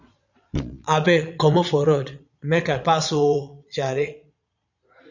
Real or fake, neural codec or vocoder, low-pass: fake; vocoder, 22.05 kHz, 80 mel bands, Vocos; 7.2 kHz